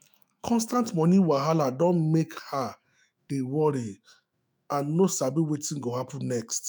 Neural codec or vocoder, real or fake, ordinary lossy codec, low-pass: autoencoder, 48 kHz, 128 numbers a frame, DAC-VAE, trained on Japanese speech; fake; none; none